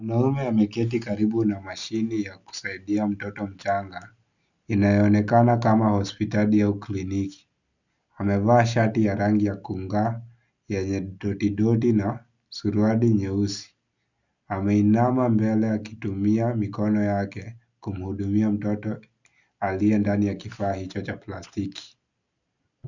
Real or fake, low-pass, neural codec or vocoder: real; 7.2 kHz; none